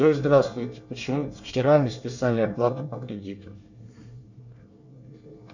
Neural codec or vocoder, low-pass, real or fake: codec, 24 kHz, 1 kbps, SNAC; 7.2 kHz; fake